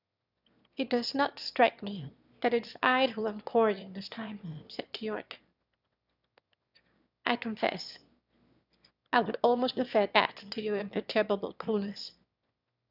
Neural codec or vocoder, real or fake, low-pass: autoencoder, 22.05 kHz, a latent of 192 numbers a frame, VITS, trained on one speaker; fake; 5.4 kHz